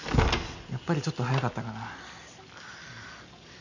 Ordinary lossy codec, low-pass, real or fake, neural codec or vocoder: none; 7.2 kHz; real; none